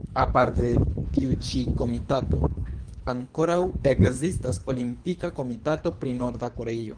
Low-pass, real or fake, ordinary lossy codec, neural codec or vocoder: 9.9 kHz; fake; Opus, 32 kbps; codec, 24 kHz, 3 kbps, HILCodec